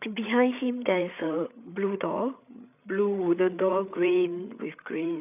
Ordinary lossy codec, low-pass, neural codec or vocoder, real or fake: none; 3.6 kHz; codec, 16 kHz, 8 kbps, FreqCodec, larger model; fake